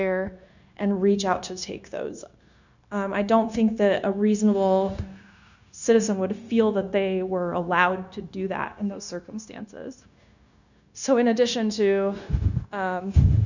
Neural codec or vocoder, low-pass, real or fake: codec, 16 kHz, 0.9 kbps, LongCat-Audio-Codec; 7.2 kHz; fake